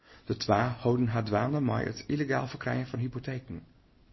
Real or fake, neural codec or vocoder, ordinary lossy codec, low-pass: real; none; MP3, 24 kbps; 7.2 kHz